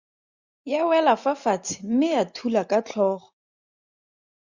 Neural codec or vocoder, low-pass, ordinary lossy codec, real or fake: none; 7.2 kHz; Opus, 64 kbps; real